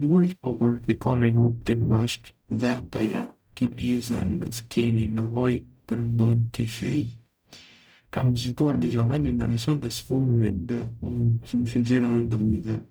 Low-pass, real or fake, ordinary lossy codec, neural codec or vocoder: none; fake; none; codec, 44.1 kHz, 0.9 kbps, DAC